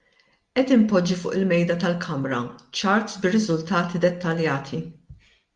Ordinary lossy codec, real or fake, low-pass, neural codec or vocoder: Opus, 32 kbps; real; 9.9 kHz; none